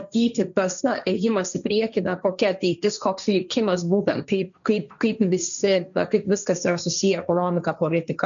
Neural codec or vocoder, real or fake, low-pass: codec, 16 kHz, 1.1 kbps, Voila-Tokenizer; fake; 7.2 kHz